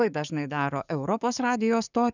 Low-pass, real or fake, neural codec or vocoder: 7.2 kHz; fake; codec, 44.1 kHz, 7.8 kbps, DAC